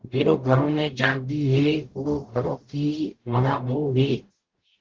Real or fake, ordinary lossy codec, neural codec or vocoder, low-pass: fake; Opus, 16 kbps; codec, 44.1 kHz, 0.9 kbps, DAC; 7.2 kHz